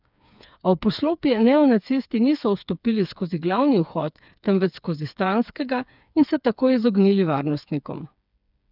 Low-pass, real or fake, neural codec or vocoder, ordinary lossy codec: 5.4 kHz; fake; codec, 16 kHz, 4 kbps, FreqCodec, smaller model; none